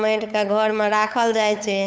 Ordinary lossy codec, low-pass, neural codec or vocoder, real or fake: none; none; codec, 16 kHz, 8 kbps, FunCodec, trained on LibriTTS, 25 frames a second; fake